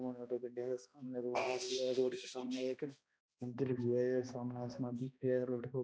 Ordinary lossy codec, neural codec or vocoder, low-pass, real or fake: none; codec, 16 kHz, 1 kbps, X-Codec, HuBERT features, trained on balanced general audio; none; fake